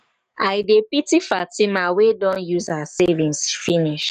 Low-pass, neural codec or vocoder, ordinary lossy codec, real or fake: 14.4 kHz; codec, 44.1 kHz, 7.8 kbps, DAC; none; fake